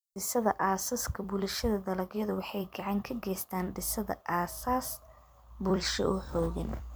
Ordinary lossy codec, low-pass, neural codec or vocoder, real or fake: none; none; vocoder, 44.1 kHz, 128 mel bands every 256 samples, BigVGAN v2; fake